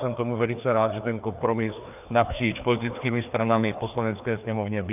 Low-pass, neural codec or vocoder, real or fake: 3.6 kHz; codec, 16 kHz, 2 kbps, FreqCodec, larger model; fake